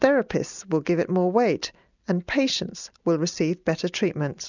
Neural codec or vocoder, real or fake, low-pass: none; real; 7.2 kHz